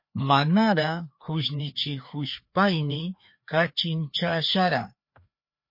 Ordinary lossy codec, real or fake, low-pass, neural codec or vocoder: MP3, 32 kbps; fake; 5.4 kHz; codec, 16 kHz, 2 kbps, FreqCodec, larger model